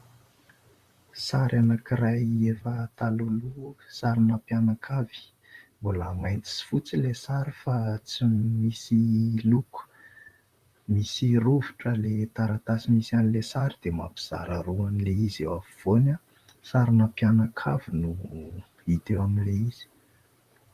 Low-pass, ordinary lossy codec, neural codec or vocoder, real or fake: 14.4 kHz; Opus, 64 kbps; vocoder, 44.1 kHz, 128 mel bands, Pupu-Vocoder; fake